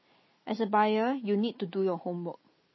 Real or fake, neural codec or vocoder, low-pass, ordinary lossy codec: real; none; 7.2 kHz; MP3, 24 kbps